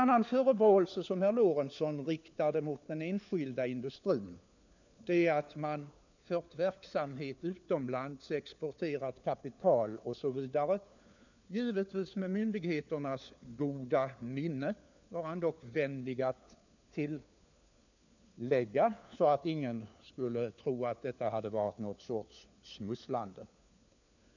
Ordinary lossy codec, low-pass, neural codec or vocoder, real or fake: none; 7.2 kHz; codec, 16 kHz, 4 kbps, FunCodec, trained on LibriTTS, 50 frames a second; fake